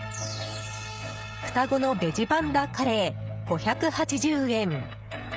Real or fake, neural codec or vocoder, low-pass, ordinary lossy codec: fake; codec, 16 kHz, 16 kbps, FreqCodec, smaller model; none; none